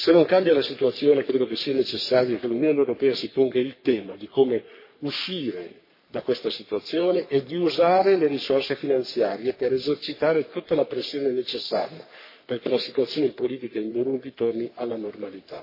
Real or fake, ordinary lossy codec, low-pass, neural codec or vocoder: fake; MP3, 24 kbps; 5.4 kHz; codec, 44.1 kHz, 3.4 kbps, Pupu-Codec